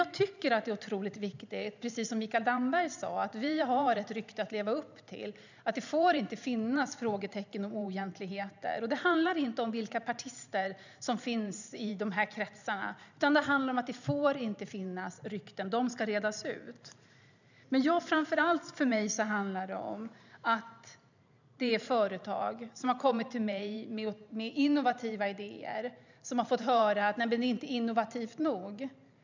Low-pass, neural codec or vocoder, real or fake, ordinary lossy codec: 7.2 kHz; vocoder, 44.1 kHz, 128 mel bands every 512 samples, BigVGAN v2; fake; none